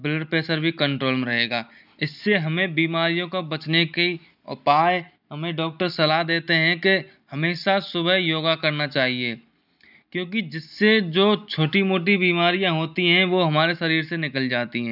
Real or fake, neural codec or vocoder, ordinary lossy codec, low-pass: real; none; none; 5.4 kHz